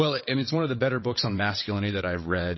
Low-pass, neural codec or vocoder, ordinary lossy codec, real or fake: 7.2 kHz; vocoder, 22.05 kHz, 80 mel bands, WaveNeXt; MP3, 24 kbps; fake